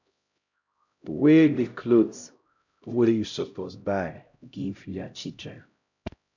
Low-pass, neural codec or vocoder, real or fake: 7.2 kHz; codec, 16 kHz, 0.5 kbps, X-Codec, HuBERT features, trained on LibriSpeech; fake